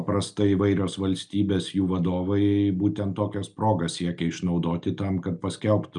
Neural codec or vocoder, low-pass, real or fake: none; 9.9 kHz; real